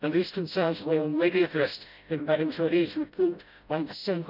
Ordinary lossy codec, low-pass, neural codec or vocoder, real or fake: none; 5.4 kHz; codec, 16 kHz, 0.5 kbps, FreqCodec, smaller model; fake